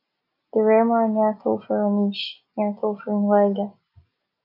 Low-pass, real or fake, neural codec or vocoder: 5.4 kHz; real; none